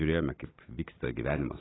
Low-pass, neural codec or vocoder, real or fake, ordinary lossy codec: 7.2 kHz; none; real; AAC, 16 kbps